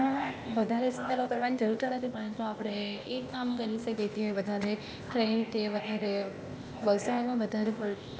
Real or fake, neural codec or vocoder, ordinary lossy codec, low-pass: fake; codec, 16 kHz, 0.8 kbps, ZipCodec; none; none